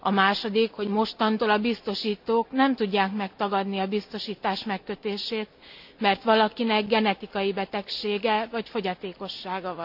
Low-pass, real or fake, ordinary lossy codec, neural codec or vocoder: 5.4 kHz; real; AAC, 48 kbps; none